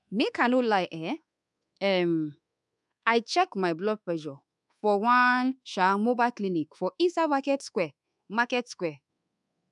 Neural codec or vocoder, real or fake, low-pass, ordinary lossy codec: codec, 24 kHz, 1.2 kbps, DualCodec; fake; 10.8 kHz; none